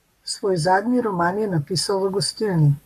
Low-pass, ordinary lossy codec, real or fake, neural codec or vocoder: 14.4 kHz; AAC, 96 kbps; fake; codec, 44.1 kHz, 7.8 kbps, Pupu-Codec